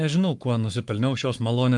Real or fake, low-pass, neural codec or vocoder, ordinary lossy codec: fake; 10.8 kHz; codec, 44.1 kHz, 7.8 kbps, Pupu-Codec; Opus, 32 kbps